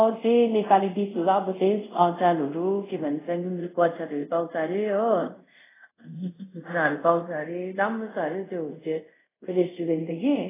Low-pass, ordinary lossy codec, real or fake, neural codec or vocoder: 3.6 kHz; AAC, 16 kbps; fake; codec, 24 kHz, 0.5 kbps, DualCodec